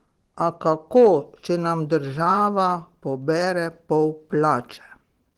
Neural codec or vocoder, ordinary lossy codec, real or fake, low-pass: vocoder, 44.1 kHz, 128 mel bands every 512 samples, BigVGAN v2; Opus, 16 kbps; fake; 19.8 kHz